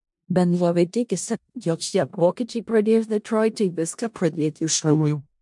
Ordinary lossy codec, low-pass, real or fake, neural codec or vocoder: MP3, 64 kbps; 10.8 kHz; fake; codec, 16 kHz in and 24 kHz out, 0.4 kbps, LongCat-Audio-Codec, four codebook decoder